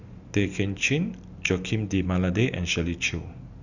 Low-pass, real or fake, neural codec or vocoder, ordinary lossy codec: 7.2 kHz; real; none; none